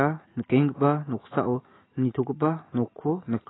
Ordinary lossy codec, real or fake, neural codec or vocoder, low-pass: AAC, 16 kbps; real; none; 7.2 kHz